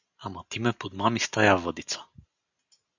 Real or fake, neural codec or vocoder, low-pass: real; none; 7.2 kHz